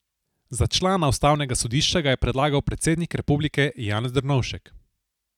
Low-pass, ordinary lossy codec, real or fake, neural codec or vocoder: 19.8 kHz; none; real; none